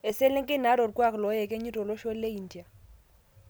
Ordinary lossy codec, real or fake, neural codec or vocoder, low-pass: none; real; none; none